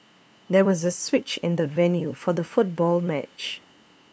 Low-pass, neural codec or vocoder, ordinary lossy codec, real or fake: none; codec, 16 kHz, 2 kbps, FunCodec, trained on LibriTTS, 25 frames a second; none; fake